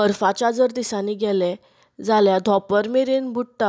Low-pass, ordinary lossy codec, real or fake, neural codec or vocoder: none; none; real; none